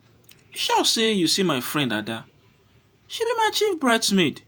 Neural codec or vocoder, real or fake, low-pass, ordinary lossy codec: vocoder, 48 kHz, 128 mel bands, Vocos; fake; none; none